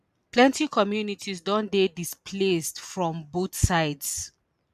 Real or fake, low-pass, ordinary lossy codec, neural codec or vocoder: real; 14.4 kHz; MP3, 96 kbps; none